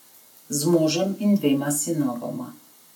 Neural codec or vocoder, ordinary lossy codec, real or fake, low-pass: none; none; real; 19.8 kHz